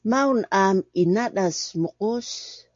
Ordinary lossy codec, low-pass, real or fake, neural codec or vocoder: MP3, 48 kbps; 7.2 kHz; real; none